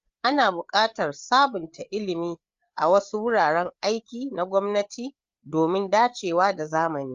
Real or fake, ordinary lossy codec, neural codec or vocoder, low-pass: fake; Opus, 32 kbps; codec, 16 kHz, 8 kbps, FreqCodec, larger model; 7.2 kHz